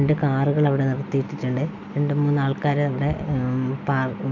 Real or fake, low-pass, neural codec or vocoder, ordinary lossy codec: real; 7.2 kHz; none; none